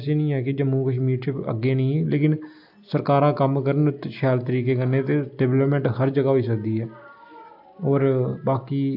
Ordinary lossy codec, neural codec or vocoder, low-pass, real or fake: AAC, 48 kbps; none; 5.4 kHz; real